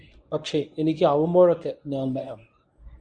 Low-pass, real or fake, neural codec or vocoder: 9.9 kHz; fake; codec, 24 kHz, 0.9 kbps, WavTokenizer, medium speech release version 1